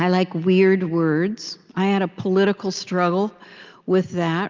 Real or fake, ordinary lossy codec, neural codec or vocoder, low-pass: real; Opus, 24 kbps; none; 7.2 kHz